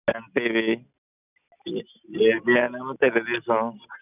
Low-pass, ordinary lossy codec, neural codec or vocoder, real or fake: 3.6 kHz; none; none; real